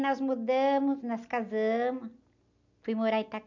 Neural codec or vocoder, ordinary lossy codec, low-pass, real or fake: none; none; 7.2 kHz; real